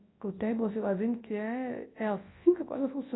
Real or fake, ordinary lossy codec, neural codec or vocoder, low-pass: fake; AAC, 16 kbps; codec, 24 kHz, 0.9 kbps, WavTokenizer, large speech release; 7.2 kHz